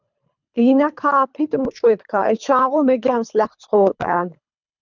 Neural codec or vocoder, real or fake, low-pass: codec, 24 kHz, 3 kbps, HILCodec; fake; 7.2 kHz